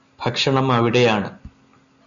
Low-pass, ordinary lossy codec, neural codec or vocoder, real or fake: 7.2 kHz; MP3, 96 kbps; none; real